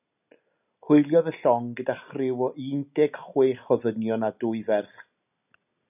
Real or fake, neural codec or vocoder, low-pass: real; none; 3.6 kHz